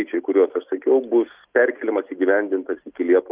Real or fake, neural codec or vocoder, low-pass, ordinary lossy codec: real; none; 3.6 kHz; Opus, 32 kbps